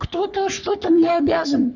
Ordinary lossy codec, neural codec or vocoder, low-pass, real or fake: none; codec, 44.1 kHz, 3.4 kbps, Pupu-Codec; 7.2 kHz; fake